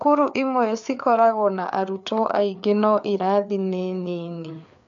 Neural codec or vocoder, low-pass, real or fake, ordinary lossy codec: codec, 16 kHz, 4 kbps, FreqCodec, larger model; 7.2 kHz; fake; MP3, 64 kbps